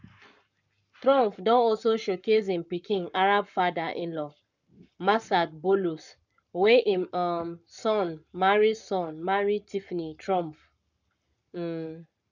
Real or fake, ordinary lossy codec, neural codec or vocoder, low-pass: fake; none; codec, 44.1 kHz, 7.8 kbps, Pupu-Codec; 7.2 kHz